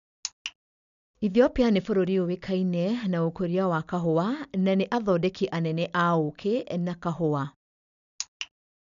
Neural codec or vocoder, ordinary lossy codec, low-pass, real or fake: none; none; 7.2 kHz; real